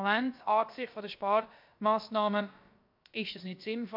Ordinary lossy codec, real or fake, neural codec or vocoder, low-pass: MP3, 48 kbps; fake; codec, 16 kHz, about 1 kbps, DyCAST, with the encoder's durations; 5.4 kHz